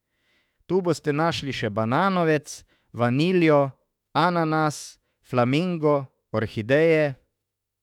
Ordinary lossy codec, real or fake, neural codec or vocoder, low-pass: none; fake; autoencoder, 48 kHz, 32 numbers a frame, DAC-VAE, trained on Japanese speech; 19.8 kHz